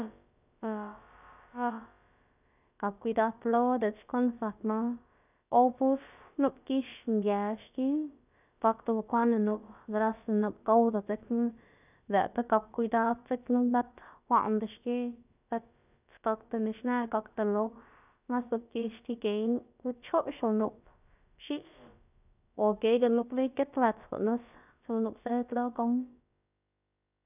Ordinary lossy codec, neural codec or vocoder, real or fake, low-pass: none; codec, 16 kHz, about 1 kbps, DyCAST, with the encoder's durations; fake; 3.6 kHz